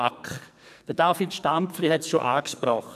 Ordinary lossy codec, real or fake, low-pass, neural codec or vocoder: none; fake; 14.4 kHz; codec, 44.1 kHz, 2.6 kbps, SNAC